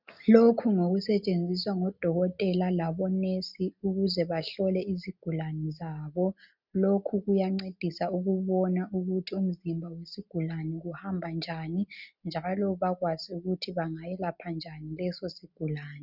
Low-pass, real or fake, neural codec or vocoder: 5.4 kHz; real; none